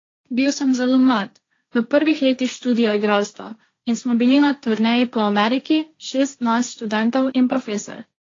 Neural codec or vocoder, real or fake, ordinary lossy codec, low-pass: codec, 16 kHz, 1.1 kbps, Voila-Tokenizer; fake; AAC, 32 kbps; 7.2 kHz